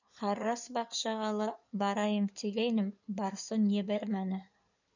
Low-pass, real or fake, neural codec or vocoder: 7.2 kHz; fake; codec, 16 kHz in and 24 kHz out, 2.2 kbps, FireRedTTS-2 codec